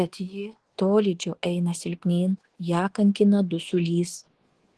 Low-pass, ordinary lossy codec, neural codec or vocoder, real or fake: 10.8 kHz; Opus, 16 kbps; codec, 24 kHz, 1.2 kbps, DualCodec; fake